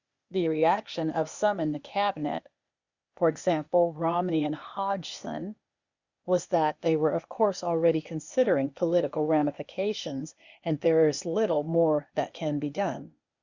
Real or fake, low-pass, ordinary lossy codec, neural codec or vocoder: fake; 7.2 kHz; Opus, 64 kbps; codec, 16 kHz, 0.8 kbps, ZipCodec